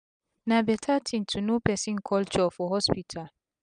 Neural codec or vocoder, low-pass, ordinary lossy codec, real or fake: none; 9.9 kHz; none; real